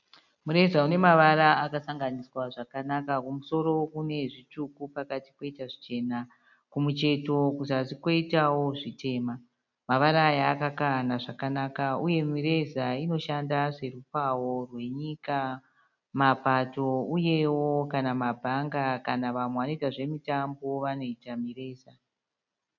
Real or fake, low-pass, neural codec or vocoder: real; 7.2 kHz; none